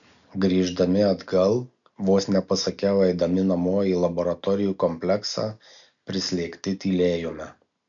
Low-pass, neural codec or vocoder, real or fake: 7.2 kHz; none; real